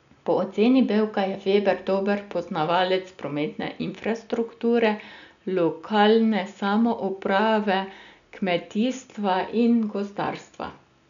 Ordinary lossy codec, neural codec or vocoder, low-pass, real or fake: none; none; 7.2 kHz; real